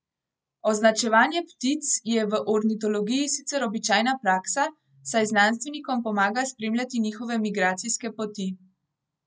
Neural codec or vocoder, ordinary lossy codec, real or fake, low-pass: none; none; real; none